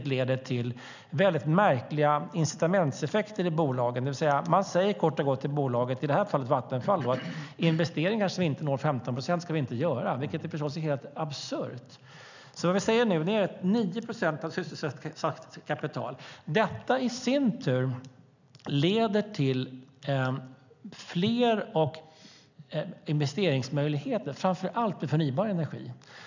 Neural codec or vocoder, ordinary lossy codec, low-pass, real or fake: none; none; 7.2 kHz; real